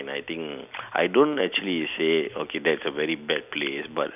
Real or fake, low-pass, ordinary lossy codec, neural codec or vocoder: real; 3.6 kHz; none; none